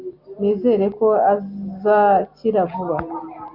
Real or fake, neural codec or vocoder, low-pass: real; none; 5.4 kHz